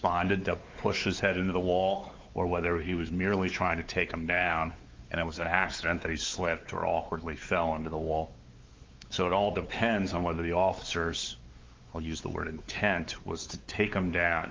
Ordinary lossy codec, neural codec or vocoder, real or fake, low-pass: Opus, 24 kbps; codec, 16 kHz, 4 kbps, X-Codec, WavLM features, trained on Multilingual LibriSpeech; fake; 7.2 kHz